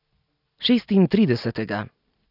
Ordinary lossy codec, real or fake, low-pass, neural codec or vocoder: none; real; 5.4 kHz; none